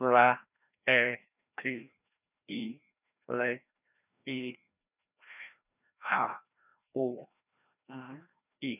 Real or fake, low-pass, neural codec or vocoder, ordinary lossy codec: fake; 3.6 kHz; codec, 16 kHz, 1 kbps, FreqCodec, larger model; none